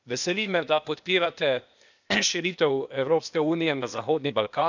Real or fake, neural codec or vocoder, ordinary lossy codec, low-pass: fake; codec, 16 kHz, 0.8 kbps, ZipCodec; none; 7.2 kHz